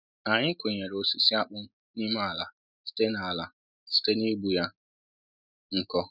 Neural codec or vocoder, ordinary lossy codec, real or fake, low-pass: none; none; real; 5.4 kHz